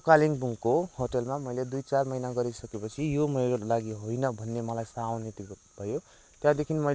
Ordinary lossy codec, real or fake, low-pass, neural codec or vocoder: none; real; none; none